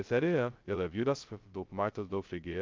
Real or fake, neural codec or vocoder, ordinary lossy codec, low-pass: fake; codec, 16 kHz, 0.2 kbps, FocalCodec; Opus, 32 kbps; 7.2 kHz